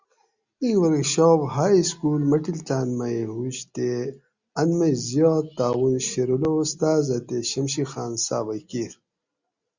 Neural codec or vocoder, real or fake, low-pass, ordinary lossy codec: none; real; 7.2 kHz; Opus, 64 kbps